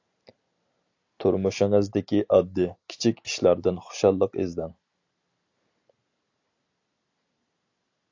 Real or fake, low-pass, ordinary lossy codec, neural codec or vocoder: real; 7.2 kHz; AAC, 48 kbps; none